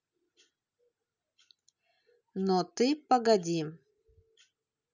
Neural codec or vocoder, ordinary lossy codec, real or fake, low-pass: none; none; real; 7.2 kHz